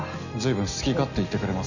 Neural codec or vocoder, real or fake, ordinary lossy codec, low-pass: none; real; none; 7.2 kHz